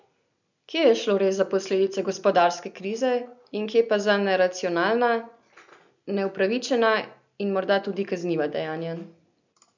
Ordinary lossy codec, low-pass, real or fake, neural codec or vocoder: none; 7.2 kHz; real; none